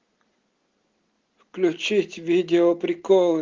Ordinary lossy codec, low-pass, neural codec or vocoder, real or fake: Opus, 16 kbps; 7.2 kHz; none; real